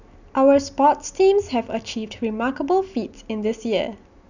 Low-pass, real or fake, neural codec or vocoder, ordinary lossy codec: 7.2 kHz; real; none; none